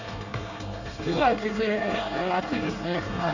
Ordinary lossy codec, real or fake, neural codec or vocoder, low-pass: none; fake; codec, 24 kHz, 1 kbps, SNAC; 7.2 kHz